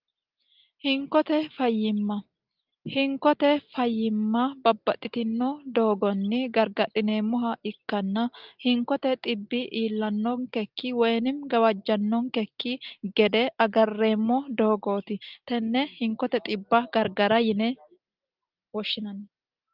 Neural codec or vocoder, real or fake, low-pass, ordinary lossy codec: none; real; 5.4 kHz; Opus, 32 kbps